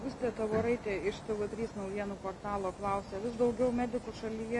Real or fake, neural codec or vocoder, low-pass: real; none; 10.8 kHz